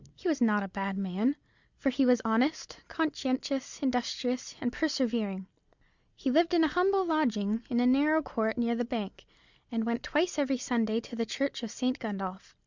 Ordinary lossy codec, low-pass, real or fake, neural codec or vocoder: Opus, 64 kbps; 7.2 kHz; real; none